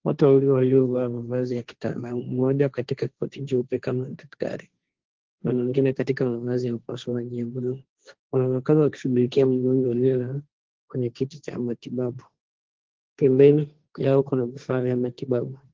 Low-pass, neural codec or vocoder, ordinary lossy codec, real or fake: 7.2 kHz; codec, 16 kHz, 1.1 kbps, Voila-Tokenizer; Opus, 16 kbps; fake